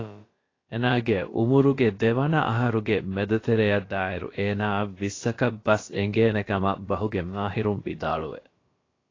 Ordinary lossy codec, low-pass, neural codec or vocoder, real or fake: AAC, 32 kbps; 7.2 kHz; codec, 16 kHz, about 1 kbps, DyCAST, with the encoder's durations; fake